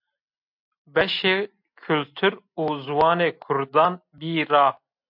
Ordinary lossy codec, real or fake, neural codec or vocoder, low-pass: MP3, 48 kbps; real; none; 5.4 kHz